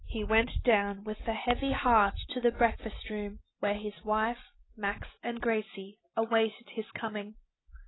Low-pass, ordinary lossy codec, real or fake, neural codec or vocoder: 7.2 kHz; AAC, 16 kbps; real; none